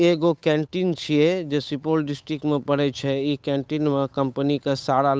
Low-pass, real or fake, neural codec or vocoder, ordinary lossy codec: none; fake; codec, 16 kHz, 8 kbps, FunCodec, trained on Chinese and English, 25 frames a second; none